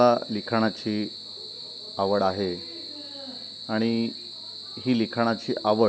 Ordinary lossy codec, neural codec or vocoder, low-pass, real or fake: none; none; none; real